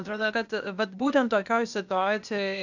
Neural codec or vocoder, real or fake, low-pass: codec, 16 kHz, 0.8 kbps, ZipCodec; fake; 7.2 kHz